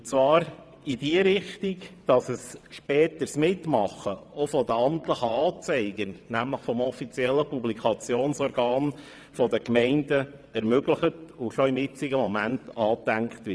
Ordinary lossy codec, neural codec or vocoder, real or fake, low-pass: none; vocoder, 22.05 kHz, 80 mel bands, WaveNeXt; fake; none